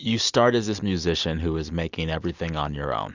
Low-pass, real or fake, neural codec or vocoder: 7.2 kHz; real; none